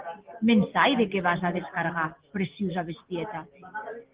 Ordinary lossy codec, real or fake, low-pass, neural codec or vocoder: Opus, 16 kbps; real; 3.6 kHz; none